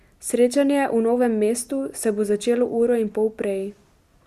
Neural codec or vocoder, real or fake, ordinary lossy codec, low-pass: none; real; Opus, 64 kbps; 14.4 kHz